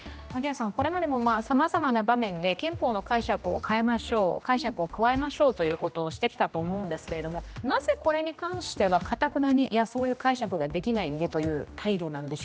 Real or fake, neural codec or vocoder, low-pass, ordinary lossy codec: fake; codec, 16 kHz, 1 kbps, X-Codec, HuBERT features, trained on general audio; none; none